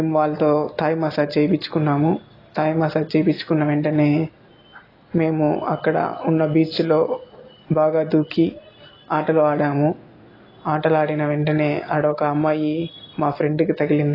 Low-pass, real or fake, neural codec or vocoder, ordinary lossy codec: 5.4 kHz; real; none; AAC, 24 kbps